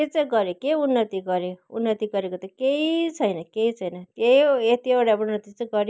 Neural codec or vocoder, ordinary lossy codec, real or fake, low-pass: none; none; real; none